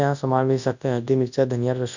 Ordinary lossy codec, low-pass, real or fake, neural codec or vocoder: none; 7.2 kHz; fake; codec, 24 kHz, 0.9 kbps, WavTokenizer, large speech release